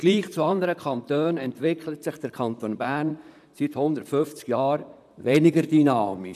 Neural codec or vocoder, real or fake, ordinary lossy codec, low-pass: vocoder, 44.1 kHz, 128 mel bands, Pupu-Vocoder; fake; none; 14.4 kHz